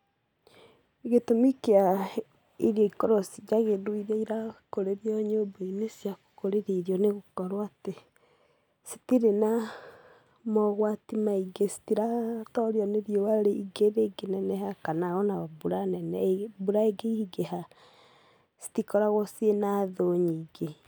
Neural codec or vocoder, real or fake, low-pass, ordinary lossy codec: none; real; none; none